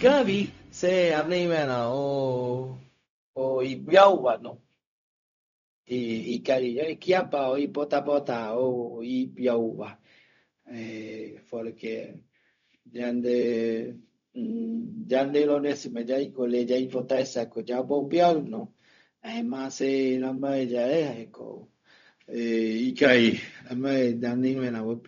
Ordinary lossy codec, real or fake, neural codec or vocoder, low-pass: none; fake; codec, 16 kHz, 0.4 kbps, LongCat-Audio-Codec; 7.2 kHz